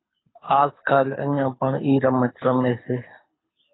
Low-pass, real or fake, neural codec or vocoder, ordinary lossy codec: 7.2 kHz; fake; codec, 24 kHz, 6 kbps, HILCodec; AAC, 16 kbps